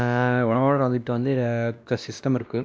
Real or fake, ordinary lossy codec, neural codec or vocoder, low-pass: fake; none; codec, 16 kHz, 1 kbps, X-Codec, WavLM features, trained on Multilingual LibriSpeech; none